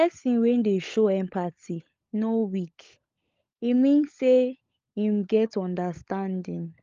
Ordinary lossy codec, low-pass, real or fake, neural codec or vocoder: Opus, 32 kbps; 7.2 kHz; fake; codec, 16 kHz, 16 kbps, FunCodec, trained on LibriTTS, 50 frames a second